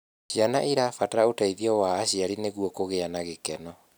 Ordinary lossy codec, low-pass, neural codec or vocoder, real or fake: none; none; none; real